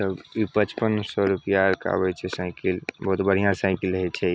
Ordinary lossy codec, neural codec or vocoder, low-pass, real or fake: none; none; none; real